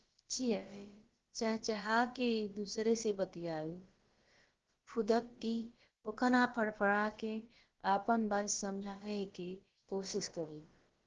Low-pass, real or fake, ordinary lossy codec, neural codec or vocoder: 7.2 kHz; fake; Opus, 16 kbps; codec, 16 kHz, about 1 kbps, DyCAST, with the encoder's durations